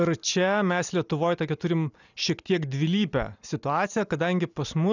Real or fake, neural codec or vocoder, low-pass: real; none; 7.2 kHz